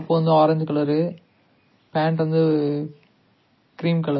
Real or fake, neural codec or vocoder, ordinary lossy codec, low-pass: real; none; MP3, 24 kbps; 7.2 kHz